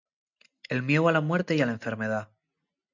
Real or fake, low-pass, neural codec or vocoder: real; 7.2 kHz; none